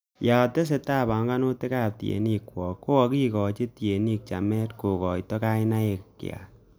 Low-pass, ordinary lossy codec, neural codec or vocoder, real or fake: none; none; none; real